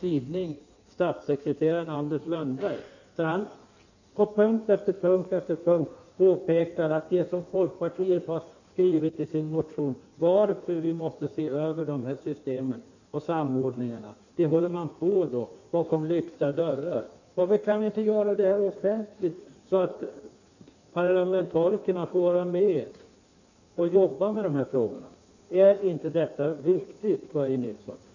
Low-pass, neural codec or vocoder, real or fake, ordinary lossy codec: 7.2 kHz; codec, 16 kHz in and 24 kHz out, 1.1 kbps, FireRedTTS-2 codec; fake; none